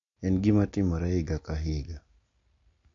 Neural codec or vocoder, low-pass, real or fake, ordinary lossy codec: none; 7.2 kHz; real; AAC, 64 kbps